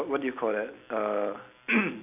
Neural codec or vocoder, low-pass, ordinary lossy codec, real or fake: none; 3.6 kHz; none; real